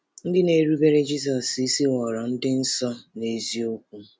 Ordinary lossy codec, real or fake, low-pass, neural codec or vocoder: none; real; none; none